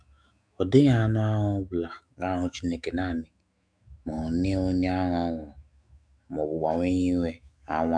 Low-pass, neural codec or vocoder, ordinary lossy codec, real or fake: 9.9 kHz; autoencoder, 48 kHz, 128 numbers a frame, DAC-VAE, trained on Japanese speech; none; fake